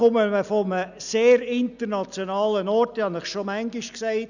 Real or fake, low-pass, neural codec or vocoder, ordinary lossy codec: real; 7.2 kHz; none; none